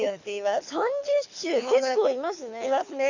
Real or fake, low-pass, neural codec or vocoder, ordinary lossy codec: fake; 7.2 kHz; codec, 24 kHz, 6 kbps, HILCodec; none